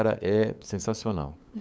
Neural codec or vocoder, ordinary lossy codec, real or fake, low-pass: codec, 16 kHz, 8 kbps, FunCodec, trained on LibriTTS, 25 frames a second; none; fake; none